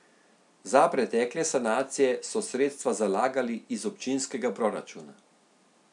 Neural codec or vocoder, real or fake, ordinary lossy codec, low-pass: none; real; none; 10.8 kHz